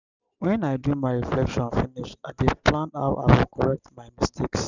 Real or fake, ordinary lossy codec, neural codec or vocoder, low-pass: real; none; none; 7.2 kHz